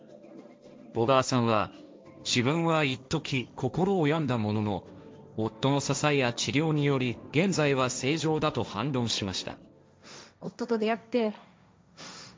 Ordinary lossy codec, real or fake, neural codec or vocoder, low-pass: none; fake; codec, 16 kHz, 1.1 kbps, Voila-Tokenizer; none